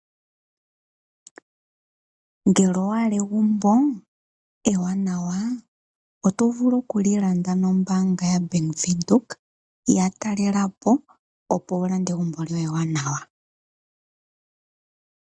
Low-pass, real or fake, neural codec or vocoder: 9.9 kHz; real; none